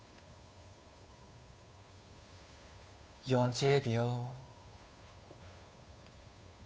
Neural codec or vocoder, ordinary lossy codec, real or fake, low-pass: codec, 16 kHz, 2 kbps, FunCodec, trained on Chinese and English, 25 frames a second; none; fake; none